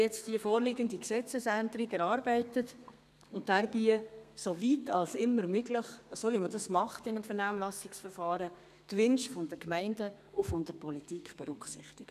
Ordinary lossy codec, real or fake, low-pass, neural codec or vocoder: none; fake; 14.4 kHz; codec, 32 kHz, 1.9 kbps, SNAC